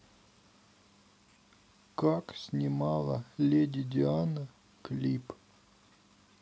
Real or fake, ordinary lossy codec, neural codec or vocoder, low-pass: real; none; none; none